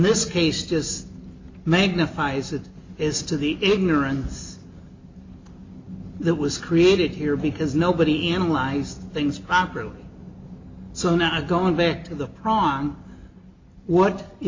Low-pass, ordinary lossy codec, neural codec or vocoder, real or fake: 7.2 kHz; MP3, 64 kbps; none; real